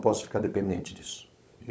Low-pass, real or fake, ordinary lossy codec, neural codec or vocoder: none; fake; none; codec, 16 kHz, 16 kbps, FunCodec, trained on LibriTTS, 50 frames a second